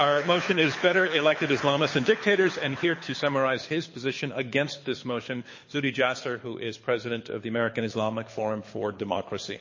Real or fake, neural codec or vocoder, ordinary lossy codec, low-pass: fake; codec, 24 kHz, 6 kbps, HILCodec; MP3, 32 kbps; 7.2 kHz